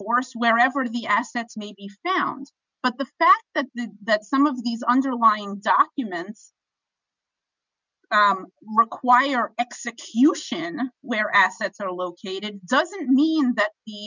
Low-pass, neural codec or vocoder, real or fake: 7.2 kHz; none; real